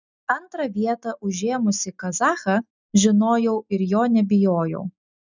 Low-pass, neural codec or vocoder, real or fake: 7.2 kHz; none; real